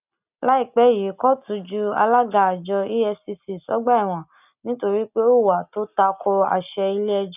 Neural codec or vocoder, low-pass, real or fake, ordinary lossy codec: none; 3.6 kHz; real; none